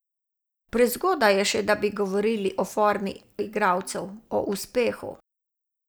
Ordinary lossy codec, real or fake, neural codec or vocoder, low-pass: none; real; none; none